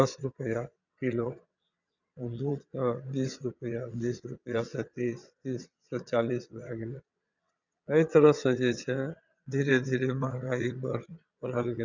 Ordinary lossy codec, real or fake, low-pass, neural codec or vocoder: none; fake; 7.2 kHz; vocoder, 22.05 kHz, 80 mel bands, WaveNeXt